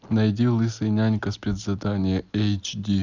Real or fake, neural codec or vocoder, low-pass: real; none; 7.2 kHz